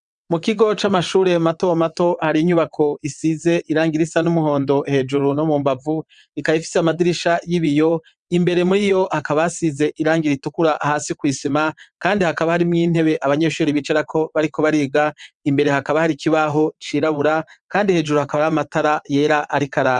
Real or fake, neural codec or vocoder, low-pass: fake; vocoder, 22.05 kHz, 80 mel bands, WaveNeXt; 9.9 kHz